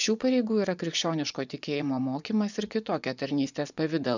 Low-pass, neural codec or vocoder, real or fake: 7.2 kHz; vocoder, 24 kHz, 100 mel bands, Vocos; fake